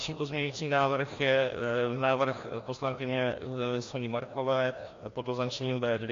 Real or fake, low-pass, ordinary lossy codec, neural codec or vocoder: fake; 7.2 kHz; AAC, 48 kbps; codec, 16 kHz, 1 kbps, FreqCodec, larger model